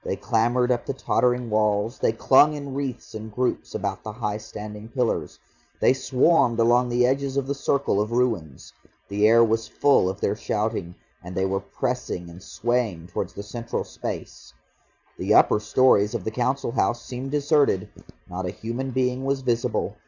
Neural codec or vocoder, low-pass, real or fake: vocoder, 44.1 kHz, 128 mel bands every 512 samples, BigVGAN v2; 7.2 kHz; fake